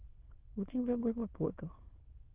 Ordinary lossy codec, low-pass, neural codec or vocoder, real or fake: Opus, 16 kbps; 3.6 kHz; autoencoder, 22.05 kHz, a latent of 192 numbers a frame, VITS, trained on many speakers; fake